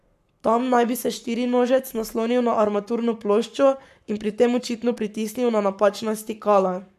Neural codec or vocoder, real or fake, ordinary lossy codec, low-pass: codec, 44.1 kHz, 7.8 kbps, Pupu-Codec; fake; none; 14.4 kHz